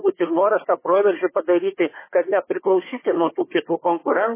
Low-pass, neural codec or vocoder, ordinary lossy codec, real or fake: 3.6 kHz; codec, 16 kHz, 4 kbps, FunCodec, trained on Chinese and English, 50 frames a second; MP3, 16 kbps; fake